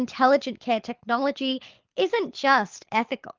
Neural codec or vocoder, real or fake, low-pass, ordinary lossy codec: codec, 24 kHz, 6 kbps, HILCodec; fake; 7.2 kHz; Opus, 32 kbps